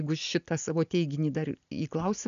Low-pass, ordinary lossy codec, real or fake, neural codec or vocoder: 7.2 kHz; MP3, 96 kbps; real; none